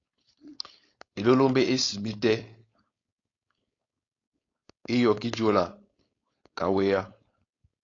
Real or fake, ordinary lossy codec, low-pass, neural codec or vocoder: fake; AAC, 32 kbps; 7.2 kHz; codec, 16 kHz, 4.8 kbps, FACodec